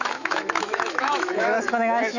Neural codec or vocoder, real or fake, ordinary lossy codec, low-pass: autoencoder, 48 kHz, 128 numbers a frame, DAC-VAE, trained on Japanese speech; fake; none; 7.2 kHz